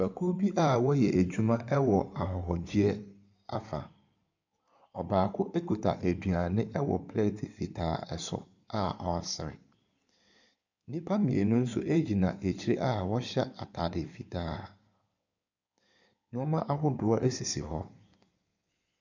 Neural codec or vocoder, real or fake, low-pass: codec, 16 kHz in and 24 kHz out, 2.2 kbps, FireRedTTS-2 codec; fake; 7.2 kHz